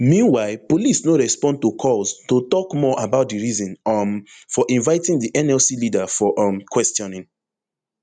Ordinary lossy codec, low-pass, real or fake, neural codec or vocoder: none; 9.9 kHz; real; none